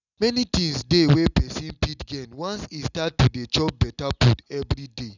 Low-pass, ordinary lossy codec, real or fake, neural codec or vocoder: 7.2 kHz; none; real; none